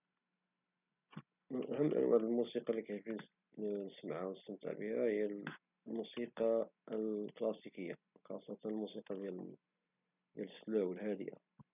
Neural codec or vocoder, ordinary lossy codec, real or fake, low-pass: none; none; real; 3.6 kHz